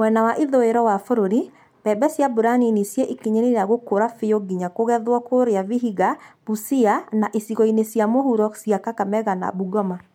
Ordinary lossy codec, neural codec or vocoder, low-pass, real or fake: MP3, 96 kbps; none; 19.8 kHz; real